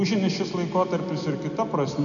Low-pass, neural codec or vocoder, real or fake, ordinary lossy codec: 7.2 kHz; none; real; MP3, 96 kbps